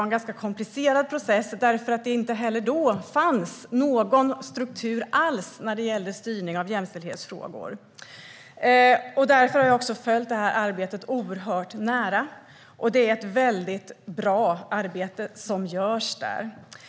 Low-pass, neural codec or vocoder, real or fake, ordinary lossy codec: none; none; real; none